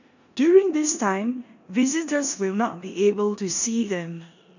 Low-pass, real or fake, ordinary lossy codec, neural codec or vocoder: 7.2 kHz; fake; none; codec, 16 kHz in and 24 kHz out, 0.9 kbps, LongCat-Audio-Codec, four codebook decoder